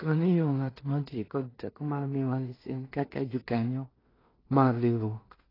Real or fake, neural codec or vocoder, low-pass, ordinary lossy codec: fake; codec, 16 kHz in and 24 kHz out, 0.4 kbps, LongCat-Audio-Codec, two codebook decoder; 5.4 kHz; AAC, 24 kbps